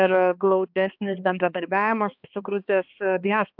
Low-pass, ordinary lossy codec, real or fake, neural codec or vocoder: 5.4 kHz; AAC, 48 kbps; fake; codec, 16 kHz, 2 kbps, X-Codec, HuBERT features, trained on balanced general audio